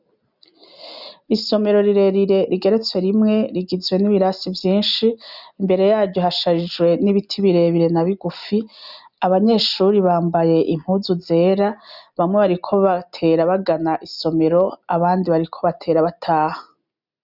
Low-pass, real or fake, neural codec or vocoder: 5.4 kHz; real; none